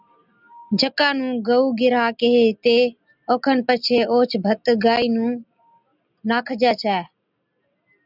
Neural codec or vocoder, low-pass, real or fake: none; 5.4 kHz; real